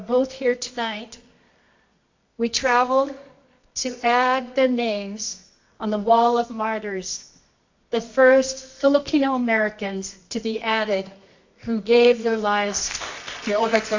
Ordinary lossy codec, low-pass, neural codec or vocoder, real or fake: AAC, 48 kbps; 7.2 kHz; codec, 24 kHz, 0.9 kbps, WavTokenizer, medium music audio release; fake